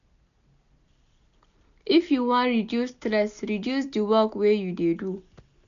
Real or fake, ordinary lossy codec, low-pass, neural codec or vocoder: real; none; 7.2 kHz; none